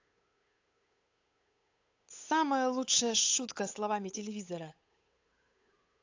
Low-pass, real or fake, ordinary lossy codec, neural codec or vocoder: 7.2 kHz; fake; AAC, 48 kbps; codec, 16 kHz, 8 kbps, FunCodec, trained on Chinese and English, 25 frames a second